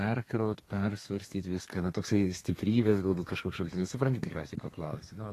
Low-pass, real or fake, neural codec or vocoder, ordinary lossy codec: 14.4 kHz; fake; codec, 32 kHz, 1.9 kbps, SNAC; AAC, 48 kbps